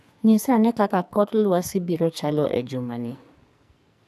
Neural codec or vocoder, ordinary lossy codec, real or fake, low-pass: codec, 44.1 kHz, 2.6 kbps, SNAC; none; fake; 14.4 kHz